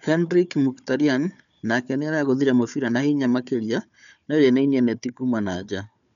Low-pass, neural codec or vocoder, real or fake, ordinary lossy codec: 7.2 kHz; codec, 16 kHz, 4 kbps, FunCodec, trained on Chinese and English, 50 frames a second; fake; none